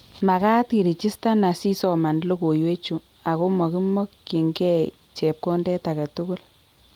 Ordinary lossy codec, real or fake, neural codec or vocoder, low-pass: Opus, 32 kbps; real; none; 19.8 kHz